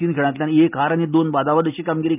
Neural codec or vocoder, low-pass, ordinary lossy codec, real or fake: none; 3.6 kHz; none; real